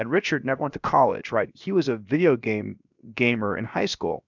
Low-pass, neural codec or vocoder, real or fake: 7.2 kHz; codec, 16 kHz, 0.7 kbps, FocalCodec; fake